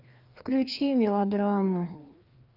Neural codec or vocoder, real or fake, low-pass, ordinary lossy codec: codec, 16 kHz, 2 kbps, FreqCodec, larger model; fake; 5.4 kHz; Opus, 24 kbps